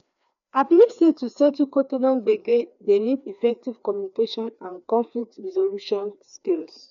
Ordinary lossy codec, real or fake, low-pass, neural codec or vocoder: none; fake; 7.2 kHz; codec, 16 kHz, 2 kbps, FreqCodec, larger model